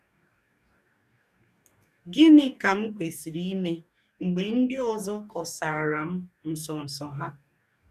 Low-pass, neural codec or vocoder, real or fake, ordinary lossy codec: 14.4 kHz; codec, 44.1 kHz, 2.6 kbps, DAC; fake; none